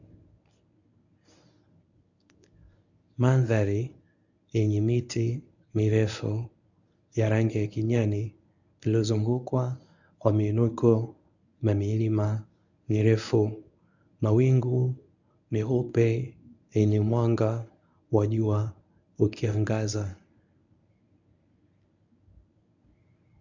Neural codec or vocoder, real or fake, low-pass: codec, 24 kHz, 0.9 kbps, WavTokenizer, medium speech release version 1; fake; 7.2 kHz